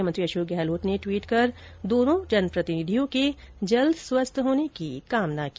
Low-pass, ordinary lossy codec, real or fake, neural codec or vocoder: none; none; real; none